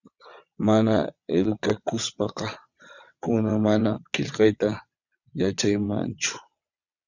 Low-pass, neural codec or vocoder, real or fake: 7.2 kHz; vocoder, 22.05 kHz, 80 mel bands, WaveNeXt; fake